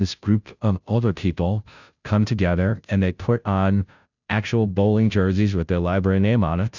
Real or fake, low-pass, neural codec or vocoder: fake; 7.2 kHz; codec, 16 kHz, 0.5 kbps, FunCodec, trained on Chinese and English, 25 frames a second